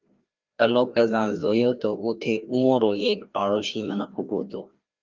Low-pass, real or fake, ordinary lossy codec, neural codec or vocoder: 7.2 kHz; fake; Opus, 32 kbps; codec, 16 kHz, 1 kbps, FreqCodec, larger model